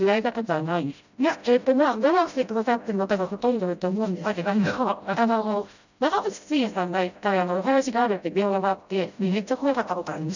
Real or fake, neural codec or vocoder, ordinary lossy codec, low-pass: fake; codec, 16 kHz, 0.5 kbps, FreqCodec, smaller model; none; 7.2 kHz